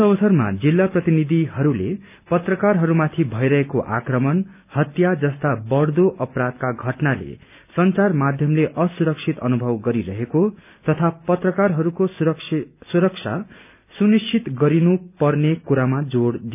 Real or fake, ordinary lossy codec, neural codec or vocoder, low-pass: real; none; none; 3.6 kHz